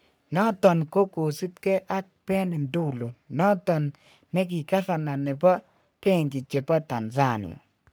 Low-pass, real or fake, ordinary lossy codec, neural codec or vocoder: none; fake; none; codec, 44.1 kHz, 3.4 kbps, Pupu-Codec